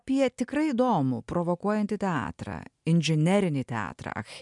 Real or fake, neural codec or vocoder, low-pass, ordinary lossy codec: real; none; 10.8 kHz; MP3, 96 kbps